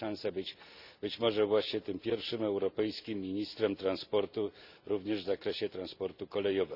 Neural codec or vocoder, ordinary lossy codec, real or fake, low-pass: none; none; real; 5.4 kHz